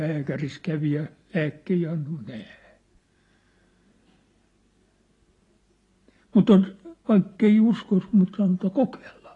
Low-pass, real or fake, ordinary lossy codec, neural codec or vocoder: 10.8 kHz; real; AAC, 32 kbps; none